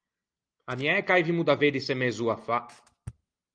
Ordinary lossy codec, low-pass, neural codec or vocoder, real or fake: Opus, 24 kbps; 9.9 kHz; none; real